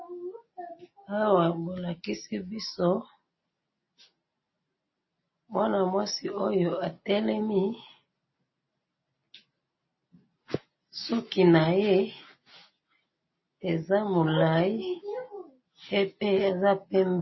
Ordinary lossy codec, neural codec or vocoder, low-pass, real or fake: MP3, 24 kbps; none; 7.2 kHz; real